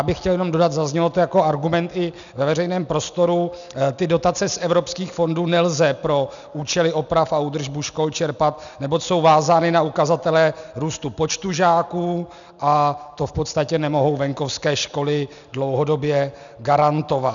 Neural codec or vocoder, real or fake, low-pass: none; real; 7.2 kHz